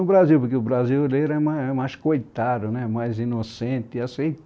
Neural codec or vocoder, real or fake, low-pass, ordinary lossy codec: none; real; none; none